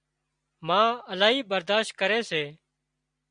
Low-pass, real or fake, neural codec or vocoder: 9.9 kHz; real; none